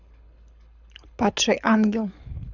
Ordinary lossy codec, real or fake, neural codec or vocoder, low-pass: none; fake; codec, 24 kHz, 6 kbps, HILCodec; 7.2 kHz